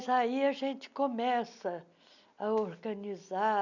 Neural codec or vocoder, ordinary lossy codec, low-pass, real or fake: none; none; 7.2 kHz; real